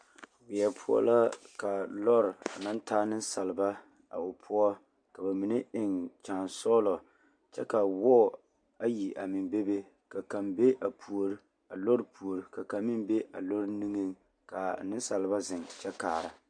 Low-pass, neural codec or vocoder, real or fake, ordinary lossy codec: 9.9 kHz; none; real; MP3, 96 kbps